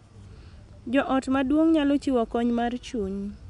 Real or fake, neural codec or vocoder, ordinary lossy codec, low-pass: real; none; none; 10.8 kHz